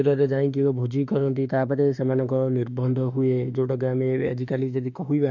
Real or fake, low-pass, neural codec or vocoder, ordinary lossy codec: fake; 7.2 kHz; autoencoder, 48 kHz, 32 numbers a frame, DAC-VAE, trained on Japanese speech; none